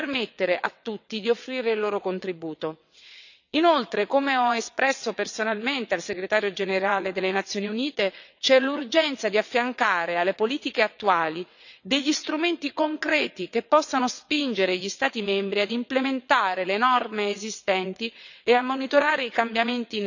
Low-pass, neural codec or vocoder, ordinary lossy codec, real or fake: 7.2 kHz; vocoder, 22.05 kHz, 80 mel bands, WaveNeXt; none; fake